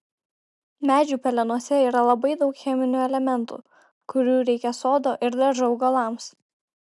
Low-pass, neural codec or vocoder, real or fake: 10.8 kHz; none; real